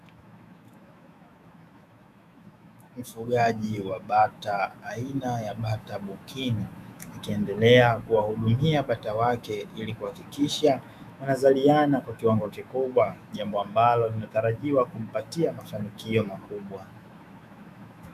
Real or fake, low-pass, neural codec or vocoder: fake; 14.4 kHz; autoencoder, 48 kHz, 128 numbers a frame, DAC-VAE, trained on Japanese speech